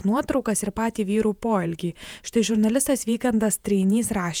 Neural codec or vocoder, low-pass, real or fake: vocoder, 48 kHz, 128 mel bands, Vocos; 19.8 kHz; fake